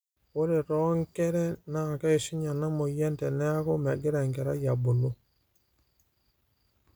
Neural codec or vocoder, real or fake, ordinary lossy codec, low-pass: none; real; none; none